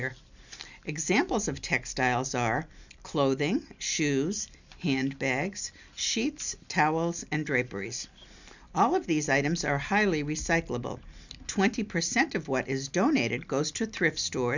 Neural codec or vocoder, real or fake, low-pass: none; real; 7.2 kHz